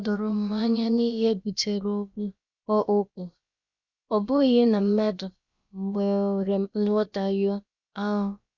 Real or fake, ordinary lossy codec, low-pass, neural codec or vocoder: fake; Opus, 64 kbps; 7.2 kHz; codec, 16 kHz, about 1 kbps, DyCAST, with the encoder's durations